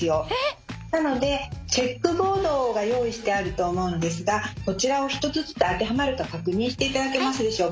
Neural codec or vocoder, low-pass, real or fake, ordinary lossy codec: none; none; real; none